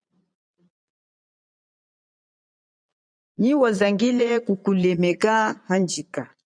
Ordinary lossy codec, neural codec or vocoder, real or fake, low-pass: MP3, 96 kbps; vocoder, 22.05 kHz, 80 mel bands, Vocos; fake; 9.9 kHz